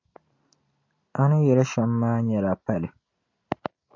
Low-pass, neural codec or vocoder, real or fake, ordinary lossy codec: 7.2 kHz; none; real; AAC, 48 kbps